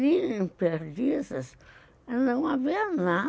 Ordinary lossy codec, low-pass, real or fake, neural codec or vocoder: none; none; real; none